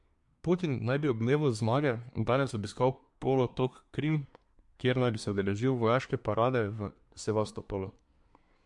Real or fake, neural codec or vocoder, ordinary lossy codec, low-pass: fake; codec, 24 kHz, 1 kbps, SNAC; MP3, 64 kbps; 10.8 kHz